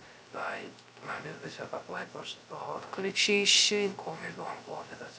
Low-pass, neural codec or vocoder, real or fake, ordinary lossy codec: none; codec, 16 kHz, 0.3 kbps, FocalCodec; fake; none